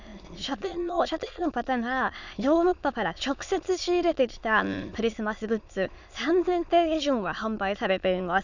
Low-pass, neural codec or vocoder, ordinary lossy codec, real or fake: 7.2 kHz; autoencoder, 22.05 kHz, a latent of 192 numbers a frame, VITS, trained on many speakers; none; fake